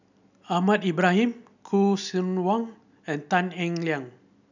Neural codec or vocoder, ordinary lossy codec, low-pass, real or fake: none; none; 7.2 kHz; real